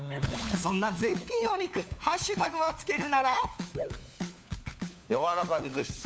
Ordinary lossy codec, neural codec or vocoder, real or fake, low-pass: none; codec, 16 kHz, 4 kbps, FunCodec, trained on LibriTTS, 50 frames a second; fake; none